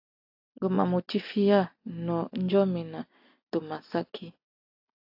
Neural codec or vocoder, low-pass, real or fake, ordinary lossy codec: none; 5.4 kHz; real; AAC, 48 kbps